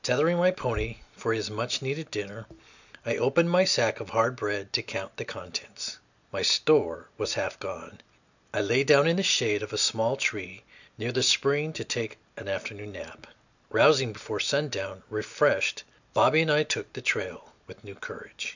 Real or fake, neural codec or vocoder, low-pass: real; none; 7.2 kHz